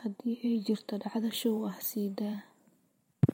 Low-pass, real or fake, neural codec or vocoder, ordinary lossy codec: 19.8 kHz; fake; vocoder, 44.1 kHz, 128 mel bands every 512 samples, BigVGAN v2; MP3, 64 kbps